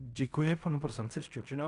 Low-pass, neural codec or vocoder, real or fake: 10.8 kHz; codec, 16 kHz in and 24 kHz out, 0.4 kbps, LongCat-Audio-Codec, fine tuned four codebook decoder; fake